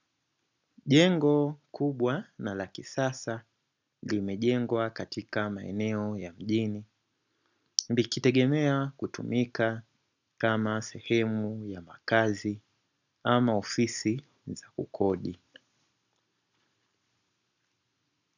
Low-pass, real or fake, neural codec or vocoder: 7.2 kHz; real; none